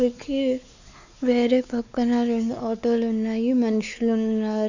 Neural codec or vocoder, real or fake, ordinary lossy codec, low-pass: codec, 16 kHz, 4 kbps, X-Codec, WavLM features, trained on Multilingual LibriSpeech; fake; none; 7.2 kHz